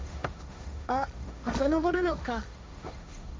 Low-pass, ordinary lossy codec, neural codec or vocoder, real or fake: none; none; codec, 16 kHz, 1.1 kbps, Voila-Tokenizer; fake